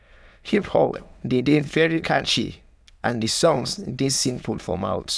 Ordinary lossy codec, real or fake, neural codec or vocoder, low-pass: none; fake; autoencoder, 22.05 kHz, a latent of 192 numbers a frame, VITS, trained on many speakers; none